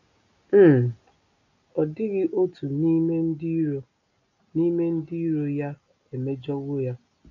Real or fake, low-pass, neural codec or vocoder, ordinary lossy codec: real; 7.2 kHz; none; none